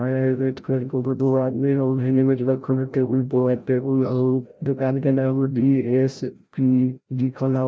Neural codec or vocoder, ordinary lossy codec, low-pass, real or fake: codec, 16 kHz, 0.5 kbps, FreqCodec, larger model; none; none; fake